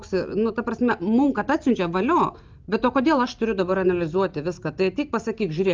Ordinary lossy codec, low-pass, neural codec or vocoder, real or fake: Opus, 32 kbps; 7.2 kHz; none; real